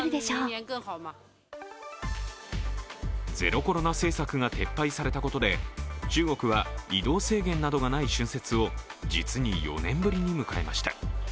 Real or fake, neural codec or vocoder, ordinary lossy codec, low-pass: real; none; none; none